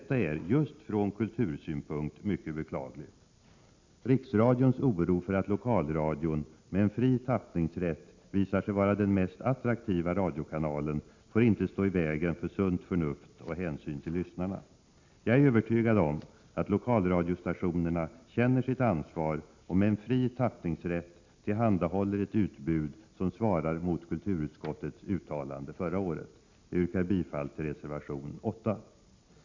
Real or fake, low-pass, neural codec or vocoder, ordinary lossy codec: real; 7.2 kHz; none; MP3, 64 kbps